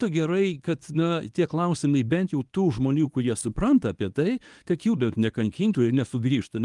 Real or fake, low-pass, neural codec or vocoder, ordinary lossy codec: fake; 10.8 kHz; codec, 24 kHz, 0.9 kbps, WavTokenizer, medium speech release version 1; Opus, 32 kbps